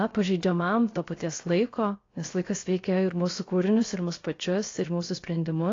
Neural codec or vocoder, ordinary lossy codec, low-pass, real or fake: codec, 16 kHz, 0.7 kbps, FocalCodec; AAC, 32 kbps; 7.2 kHz; fake